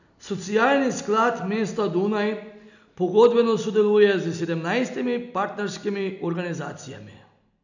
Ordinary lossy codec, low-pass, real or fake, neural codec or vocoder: none; 7.2 kHz; real; none